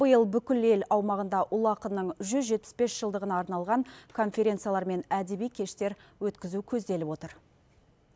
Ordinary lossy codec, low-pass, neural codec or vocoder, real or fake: none; none; none; real